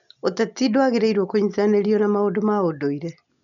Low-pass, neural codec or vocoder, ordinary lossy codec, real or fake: 7.2 kHz; none; none; real